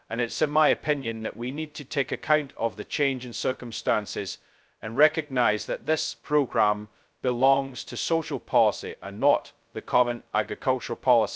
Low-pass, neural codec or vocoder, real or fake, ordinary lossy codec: none; codec, 16 kHz, 0.2 kbps, FocalCodec; fake; none